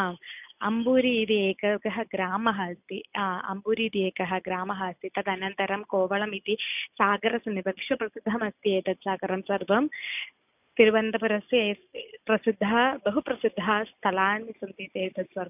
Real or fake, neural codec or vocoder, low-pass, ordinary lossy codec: real; none; 3.6 kHz; none